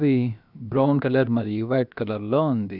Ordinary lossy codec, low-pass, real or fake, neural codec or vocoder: none; 5.4 kHz; fake; codec, 16 kHz, about 1 kbps, DyCAST, with the encoder's durations